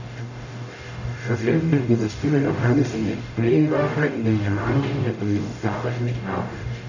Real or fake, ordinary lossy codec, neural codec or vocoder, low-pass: fake; none; codec, 44.1 kHz, 0.9 kbps, DAC; 7.2 kHz